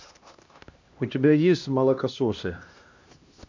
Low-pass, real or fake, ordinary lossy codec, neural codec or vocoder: 7.2 kHz; fake; MP3, 64 kbps; codec, 16 kHz, 1 kbps, X-Codec, HuBERT features, trained on LibriSpeech